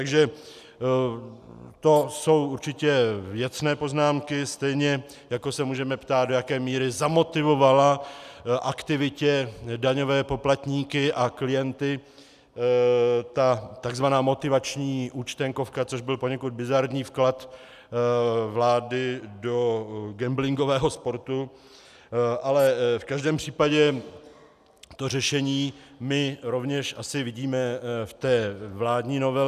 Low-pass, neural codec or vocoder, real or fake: 14.4 kHz; none; real